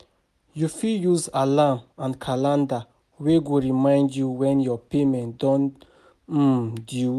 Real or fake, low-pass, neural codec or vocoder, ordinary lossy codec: real; 14.4 kHz; none; none